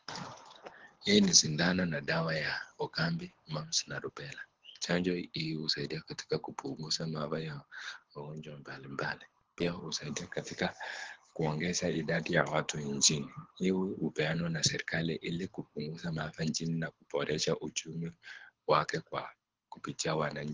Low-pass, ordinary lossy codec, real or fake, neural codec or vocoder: 7.2 kHz; Opus, 16 kbps; fake; codec, 24 kHz, 6 kbps, HILCodec